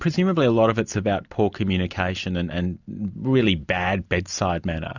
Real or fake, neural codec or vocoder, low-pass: real; none; 7.2 kHz